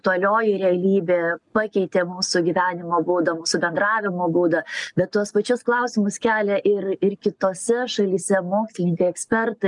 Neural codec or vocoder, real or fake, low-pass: none; real; 10.8 kHz